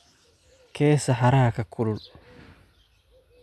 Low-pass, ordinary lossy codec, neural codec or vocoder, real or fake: none; none; none; real